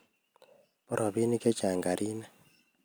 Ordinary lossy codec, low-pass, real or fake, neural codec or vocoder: none; none; real; none